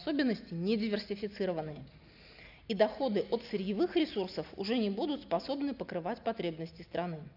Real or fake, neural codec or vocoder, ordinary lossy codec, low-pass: real; none; none; 5.4 kHz